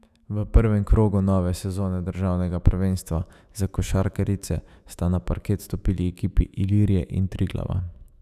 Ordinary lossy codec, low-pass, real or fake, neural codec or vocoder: none; 14.4 kHz; fake; autoencoder, 48 kHz, 128 numbers a frame, DAC-VAE, trained on Japanese speech